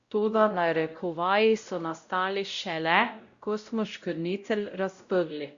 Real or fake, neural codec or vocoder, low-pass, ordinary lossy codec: fake; codec, 16 kHz, 0.5 kbps, X-Codec, WavLM features, trained on Multilingual LibriSpeech; 7.2 kHz; Opus, 64 kbps